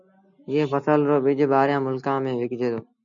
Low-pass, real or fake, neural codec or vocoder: 7.2 kHz; real; none